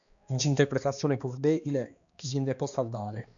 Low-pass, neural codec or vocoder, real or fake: 7.2 kHz; codec, 16 kHz, 2 kbps, X-Codec, HuBERT features, trained on balanced general audio; fake